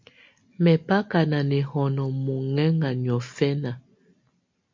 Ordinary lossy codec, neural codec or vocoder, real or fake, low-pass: MP3, 48 kbps; none; real; 7.2 kHz